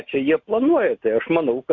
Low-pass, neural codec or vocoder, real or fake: 7.2 kHz; none; real